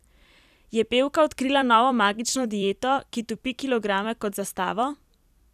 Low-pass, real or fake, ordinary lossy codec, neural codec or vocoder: 14.4 kHz; fake; none; vocoder, 44.1 kHz, 128 mel bands every 256 samples, BigVGAN v2